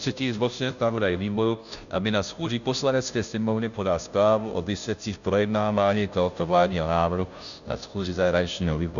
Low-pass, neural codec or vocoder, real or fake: 7.2 kHz; codec, 16 kHz, 0.5 kbps, FunCodec, trained on Chinese and English, 25 frames a second; fake